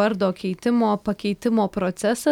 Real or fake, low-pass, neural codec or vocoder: real; 19.8 kHz; none